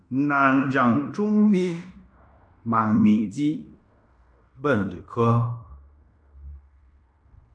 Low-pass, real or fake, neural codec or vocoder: 9.9 kHz; fake; codec, 16 kHz in and 24 kHz out, 0.9 kbps, LongCat-Audio-Codec, fine tuned four codebook decoder